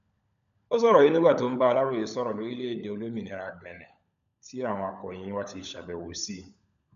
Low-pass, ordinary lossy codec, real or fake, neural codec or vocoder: 7.2 kHz; none; fake; codec, 16 kHz, 16 kbps, FunCodec, trained on LibriTTS, 50 frames a second